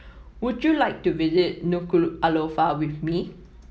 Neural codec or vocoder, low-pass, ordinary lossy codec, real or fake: none; none; none; real